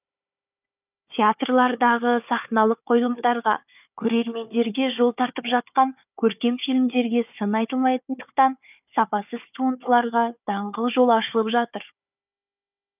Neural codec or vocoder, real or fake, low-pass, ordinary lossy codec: codec, 16 kHz, 4 kbps, FunCodec, trained on Chinese and English, 50 frames a second; fake; 3.6 kHz; none